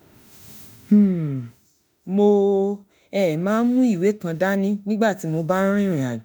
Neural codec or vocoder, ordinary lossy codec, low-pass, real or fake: autoencoder, 48 kHz, 32 numbers a frame, DAC-VAE, trained on Japanese speech; none; none; fake